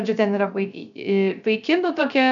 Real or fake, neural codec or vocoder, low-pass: fake; codec, 16 kHz, 0.3 kbps, FocalCodec; 7.2 kHz